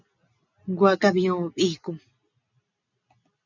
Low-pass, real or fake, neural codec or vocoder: 7.2 kHz; real; none